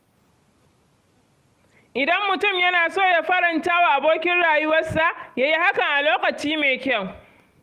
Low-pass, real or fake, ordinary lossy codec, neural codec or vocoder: 14.4 kHz; real; Opus, 32 kbps; none